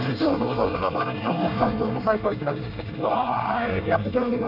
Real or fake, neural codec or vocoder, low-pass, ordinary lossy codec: fake; codec, 24 kHz, 1 kbps, SNAC; 5.4 kHz; none